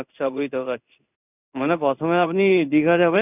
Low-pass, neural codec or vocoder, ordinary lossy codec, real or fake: 3.6 kHz; codec, 16 kHz in and 24 kHz out, 1 kbps, XY-Tokenizer; none; fake